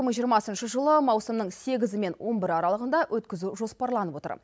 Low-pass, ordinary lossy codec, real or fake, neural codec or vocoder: none; none; real; none